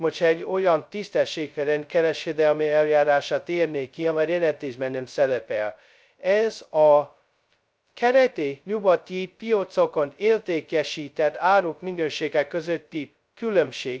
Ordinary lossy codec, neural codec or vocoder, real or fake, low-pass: none; codec, 16 kHz, 0.2 kbps, FocalCodec; fake; none